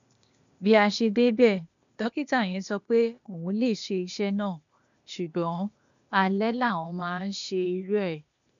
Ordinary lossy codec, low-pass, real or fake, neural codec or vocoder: none; 7.2 kHz; fake; codec, 16 kHz, 0.8 kbps, ZipCodec